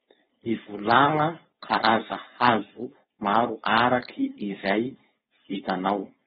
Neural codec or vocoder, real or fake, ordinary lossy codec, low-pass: codec, 16 kHz, 4.8 kbps, FACodec; fake; AAC, 16 kbps; 7.2 kHz